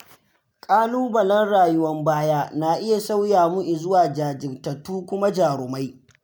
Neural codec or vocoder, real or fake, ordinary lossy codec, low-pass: none; real; none; none